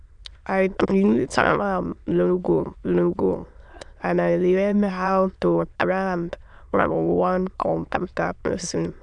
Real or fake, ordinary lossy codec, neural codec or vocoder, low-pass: fake; none; autoencoder, 22.05 kHz, a latent of 192 numbers a frame, VITS, trained on many speakers; 9.9 kHz